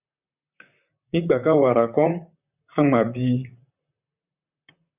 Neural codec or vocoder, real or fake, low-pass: vocoder, 44.1 kHz, 128 mel bands, Pupu-Vocoder; fake; 3.6 kHz